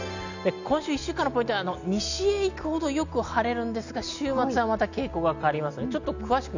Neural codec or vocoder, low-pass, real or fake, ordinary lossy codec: none; 7.2 kHz; real; none